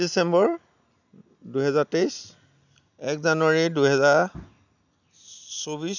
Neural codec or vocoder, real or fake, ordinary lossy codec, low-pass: none; real; none; 7.2 kHz